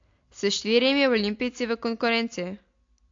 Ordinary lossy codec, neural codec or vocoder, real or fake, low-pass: AAC, 48 kbps; none; real; 7.2 kHz